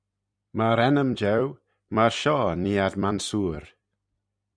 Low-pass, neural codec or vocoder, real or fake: 9.9 kHz; vocoder, 44.1 kHz, 128 mel bands every 256 samples, BigVGAN v2; fake